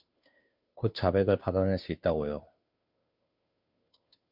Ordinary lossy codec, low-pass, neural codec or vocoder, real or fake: MP3, 48 kbps; 5.4 kHz; codec, 16 kHz, 2 kbps, FunCodec, trained on Chinese and English, 25 frames a second; fake